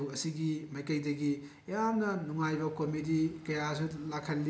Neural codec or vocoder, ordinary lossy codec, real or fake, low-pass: none; none; real; none